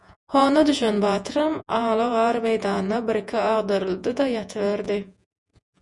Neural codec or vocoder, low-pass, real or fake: vocoder, 48 kHz, 128 mel bands, Vocos; 10.8 kHz; fake